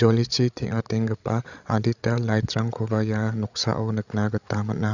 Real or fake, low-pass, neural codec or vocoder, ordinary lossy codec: fake; 7.2 kHz; codec, 16 kHz, 16 kbps, FreqCodec, larger model; none